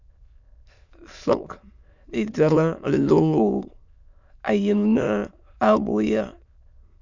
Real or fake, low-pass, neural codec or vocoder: fake; 7.2 kHz; autoencoder, 22.05 kHz, a latent of 192 numbers a frame, VITS, trained on many speakers